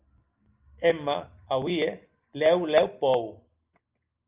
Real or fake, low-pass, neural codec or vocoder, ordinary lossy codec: real; 3.6 kHz; none; Opus, 24 kbps